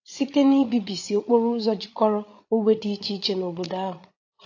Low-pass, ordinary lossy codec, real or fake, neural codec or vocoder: 7.2 kHz; AAC, 48 kbps; fake; codec, 16 kHz, 8 kbps, FreqCodec, larger model